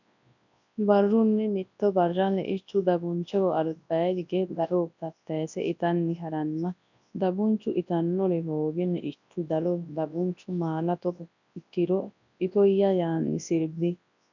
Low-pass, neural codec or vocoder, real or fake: 7.2 kHz; codec, 24 kHz, 0.9 kbps, WavTokenizer, large speech release; fake